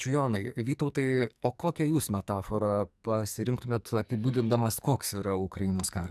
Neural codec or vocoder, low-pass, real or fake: codec, 44.1 kHz, 2.6 kbps, SNAC; 14.4 kHz; fake